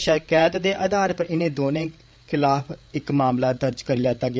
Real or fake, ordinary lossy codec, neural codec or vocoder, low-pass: fake; none; codec, 16 kHz, 8 kbps, FreqCodec, larger model; none